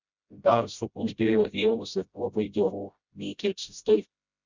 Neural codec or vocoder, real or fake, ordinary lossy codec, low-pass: codec, 16 kHz, 0.5 kbps, FreqCodec, smaller model; fake; Opus, 64 kbps; 7.2 kHz